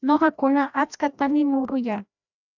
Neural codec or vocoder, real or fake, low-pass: codec, 16 kHz, 1 kbps, FreqCodec, larger model; fake; 7.2 kHz